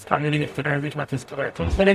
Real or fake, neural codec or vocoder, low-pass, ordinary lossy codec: fake; codec, 44.1 kHz, 0.9 kbps, DAC; 14.4 kHz; AAC, 96 kbps